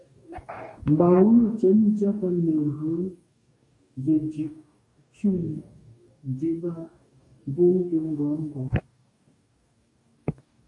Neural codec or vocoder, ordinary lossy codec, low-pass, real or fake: codec, 44.1 kHz, 2.6 kbps, DAC; MP3, 64 kbps; 10.8 kHz; fake